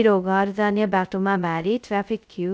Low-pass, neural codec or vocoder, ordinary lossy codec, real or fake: none; codec, 16 kHz, 0.2 kbps, FocalCodec; none; fake